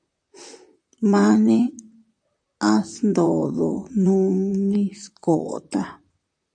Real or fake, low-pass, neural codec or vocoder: fake; 9.9 kHz; vocoder, 44.1 kHz, 128 mel bands, Pupu-Vocoder